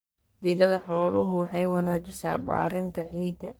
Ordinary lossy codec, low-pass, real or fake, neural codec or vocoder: none; none; fake; codec, 44.1 kHz, 1.7 kbps, Pupu-Codec